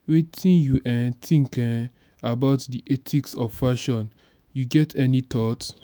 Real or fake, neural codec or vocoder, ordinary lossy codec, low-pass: fake; autoencoder, 48 kHz, 128 numbers a frame, DAC-VAE, trained on Japanese speech; none; none